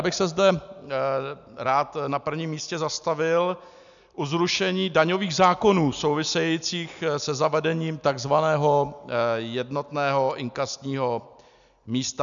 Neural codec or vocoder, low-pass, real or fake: none; 7.2 kHz; real